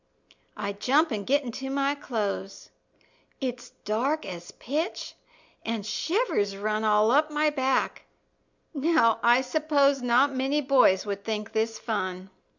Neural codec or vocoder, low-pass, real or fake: none; 7.2 kHz; real